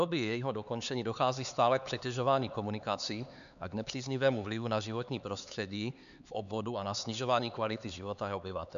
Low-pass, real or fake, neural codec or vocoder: 7.2 kHz; fake; codec, 16 kHz, 4 kbps, X-Codec, HuBERT features, trained on LibriSpeech